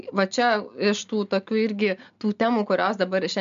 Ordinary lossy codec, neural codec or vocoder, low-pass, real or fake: MP3, 64 kbps; none; 7.2 kHz; real